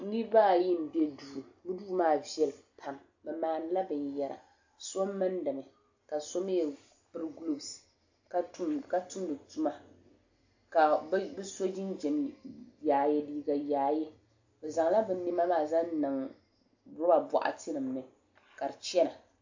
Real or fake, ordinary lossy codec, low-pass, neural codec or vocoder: real; AAC, 48 kbps; 7.2 kHz; none